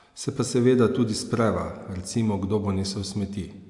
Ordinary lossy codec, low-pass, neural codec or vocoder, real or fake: AAC, 64 kbps; 10.8 kHz; none; real